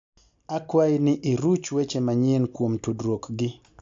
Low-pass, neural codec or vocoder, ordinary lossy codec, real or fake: 7.2 kHz; none; AAC, 64 kbps; real